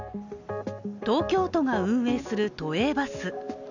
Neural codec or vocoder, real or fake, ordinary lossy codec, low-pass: none; real; none; 7.2 kHz